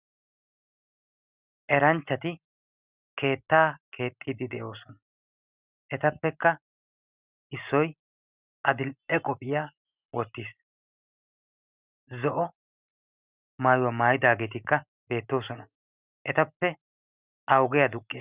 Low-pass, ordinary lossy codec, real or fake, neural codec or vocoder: 3.6 kHz; Opus, 64 kbps; real; none